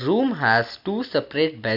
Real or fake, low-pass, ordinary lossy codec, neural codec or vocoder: real; 5.4 kHz; none; none